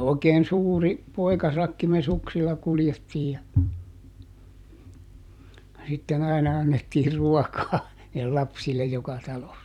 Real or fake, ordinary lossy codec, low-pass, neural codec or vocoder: fake; none; 19.8 kHz; vocoder, 44.1 kHz, 128 mel bands every 256 samples, BigVGAN v2